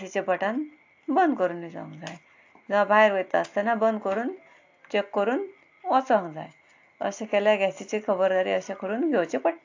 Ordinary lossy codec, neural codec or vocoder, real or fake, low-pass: AAC, 48 kbps; none; real; 7.2 kHz